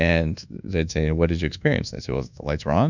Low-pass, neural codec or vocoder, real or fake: 7.2 kHz; codec, 24 kHz, 1.2 kbps, DualCodec; fake